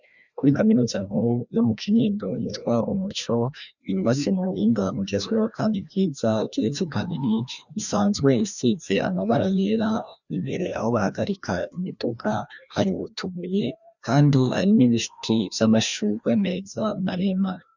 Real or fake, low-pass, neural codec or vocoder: fake; 7.2 kHz; codec, 16 kHz, 1 kbps, FreqCodec, larger model